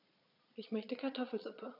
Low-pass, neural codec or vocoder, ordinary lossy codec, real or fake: 5.4 kHz; vocoder, 22.05 kHz, 80 mel bands, Vocos; none; fake